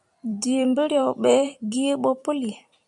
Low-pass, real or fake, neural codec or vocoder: 10.8 kHz; fake; vocoder, 44.1 kHz, 128 mel bands every 256 samples, BigVGAN v2